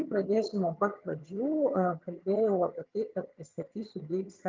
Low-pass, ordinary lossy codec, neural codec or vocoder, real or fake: 7.2 kHz; Opus, 24 kbps; vocoder, 22.05 kHz, 80 mel bands, HiFi-GAN; fake